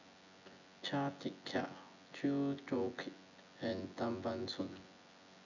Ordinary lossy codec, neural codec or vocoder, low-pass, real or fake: none; vocoder, 24 kHz, 100 mel bands, Vocos; 7.2 kHz; fake